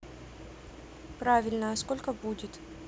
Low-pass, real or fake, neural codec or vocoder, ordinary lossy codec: none; real; none; none